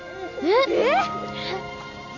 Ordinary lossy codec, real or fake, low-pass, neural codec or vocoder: none; real; 7.2 kHz; none